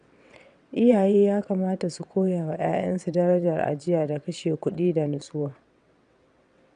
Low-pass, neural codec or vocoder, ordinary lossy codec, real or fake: 9.9 kHz; vocoder, 22.05 kHz, 80 mel bands, WaveNeXt; none; fake